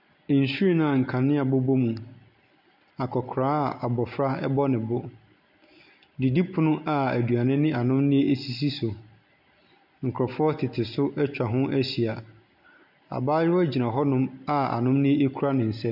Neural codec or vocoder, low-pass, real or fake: none; 5.4 kHz; real